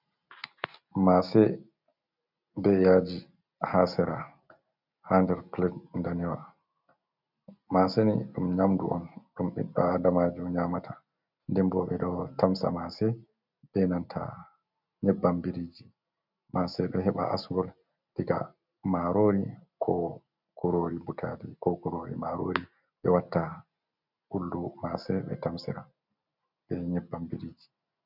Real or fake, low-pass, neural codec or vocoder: real; 5.4 kHz; none